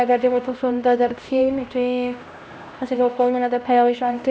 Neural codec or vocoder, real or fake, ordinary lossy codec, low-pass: codec, 16 kHz, 1 kbps, X-Codec, HuBERT features, trained on LibriSpeech; fake; none; none